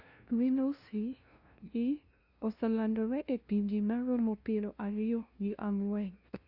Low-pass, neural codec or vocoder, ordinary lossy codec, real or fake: 5.4 kHz; codec, 16 kHz, 0.5 kbps, FunCodec, trained on LibriTTS, 25 frames a second; Opus, 64 kbps; fake